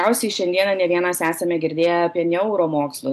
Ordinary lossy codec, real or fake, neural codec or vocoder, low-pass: AAC, 96 kbps; real; none; 14.4 kHz